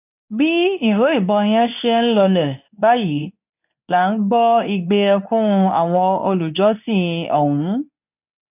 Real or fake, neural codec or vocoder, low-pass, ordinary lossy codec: fake; codec, 24 kHz, 0.9 kbps, WavTokenizer, medium speech release version 2; 3.6 kHz; none